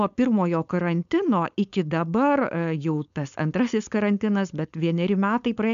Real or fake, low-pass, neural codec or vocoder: fake; 7.2 kHz; codec, 16 kHz, 4.8 kbps, FACodec